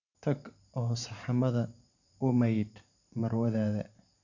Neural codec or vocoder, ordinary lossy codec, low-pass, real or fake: vocoder, 44.1 kHz, 128 mel bands every 512 samples, BigVGAN v2; none; 7.2 kHz; fake